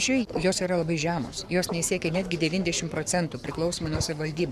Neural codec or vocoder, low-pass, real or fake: codec, 44.1 kHz, 7.8 kbps, Pupu-Codec; 14.4 kHz; fake